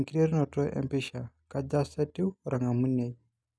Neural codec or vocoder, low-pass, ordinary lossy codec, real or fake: none; none; none; real